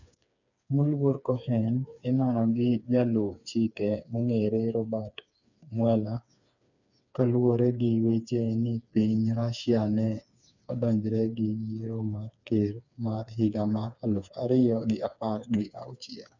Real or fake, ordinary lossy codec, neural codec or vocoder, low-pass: fake; none; codec, 16 kHz, 4 kbps, FreqCodec, smaller model; 7.2 kHz